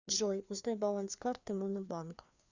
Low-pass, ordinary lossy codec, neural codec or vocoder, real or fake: 7.2 kHz; Opus, 64 kbps; codec, 16 kHz, 2 kbps, FreqCodec, larger model; fake